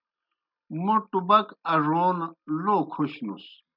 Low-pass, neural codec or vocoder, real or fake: 5.4 kHz; none; real